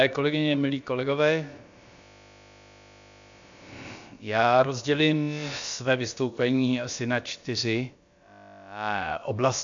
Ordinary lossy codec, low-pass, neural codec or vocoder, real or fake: AAC, 64 kbps; 7.2 kHz; codec, 16 kHz, about 1 kbps, DyCAST, with the encoder's durations; fake